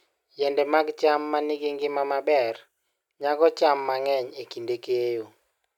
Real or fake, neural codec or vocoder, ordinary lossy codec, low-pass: real; none; none; 19.8 kHz